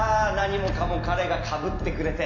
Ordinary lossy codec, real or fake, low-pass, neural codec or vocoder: MP3, 48 kbps; real; 7.2 kHz; none